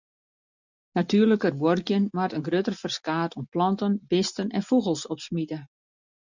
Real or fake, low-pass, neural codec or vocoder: real; 7.2 kHz; none